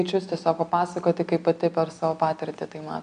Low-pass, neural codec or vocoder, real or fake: 9.9 kHz; none; real